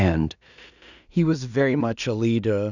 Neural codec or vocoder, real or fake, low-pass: codec, 16 kHz in and 24 kHz out, 0.4 kbps, LongCat-Audio-Codec, two codebook decoder; fake; 7.2 kHz